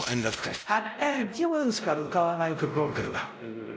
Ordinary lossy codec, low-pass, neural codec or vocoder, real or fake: none; none; codec, 16 kHz, 0.5 kbps, X-Codec, WavLM features, trained on Multilingual LibriSpeech; fake